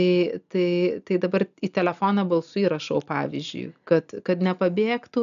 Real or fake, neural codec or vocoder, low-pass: real; none; 7.2 kHz